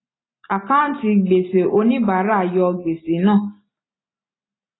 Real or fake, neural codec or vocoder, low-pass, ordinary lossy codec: real; none; 7.2 kHz; AAC, 16 kbps